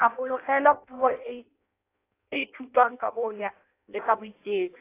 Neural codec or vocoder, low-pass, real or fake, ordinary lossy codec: codec, 16 kHz in and 24 kHz out, 0.6 kbps, FireRedTTS-2 codec; 3.6 kHz; fake; AAC, 24 kbps